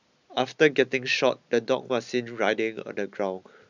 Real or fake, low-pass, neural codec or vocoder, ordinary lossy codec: real; 7.2 kHz; none; none